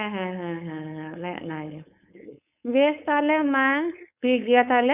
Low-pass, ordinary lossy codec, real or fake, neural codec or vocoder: 3.6 kHz; none; fake; codec, 16 kHz, 4.8 kbps, FACodec